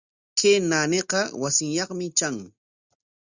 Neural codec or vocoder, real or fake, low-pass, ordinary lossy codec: none; real; 7.2 kHz; Opus, 64 kbps